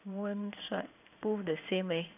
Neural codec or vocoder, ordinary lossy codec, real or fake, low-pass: codec, 16 kHz in and 24 kHz out, 1 kbps, XY-Tokenizer; none; fake; 3.6 kHz